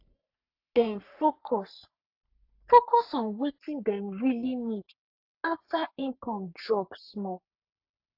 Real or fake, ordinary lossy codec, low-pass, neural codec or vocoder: fake; AAC, 48 kbps; 5.4 kHz; codec, 44.1 kHz, 3.4 kbps, Pupu-Codec